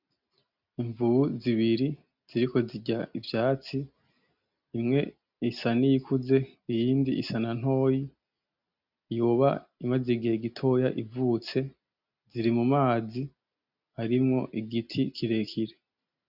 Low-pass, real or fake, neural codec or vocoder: 5.4 kHz; real; none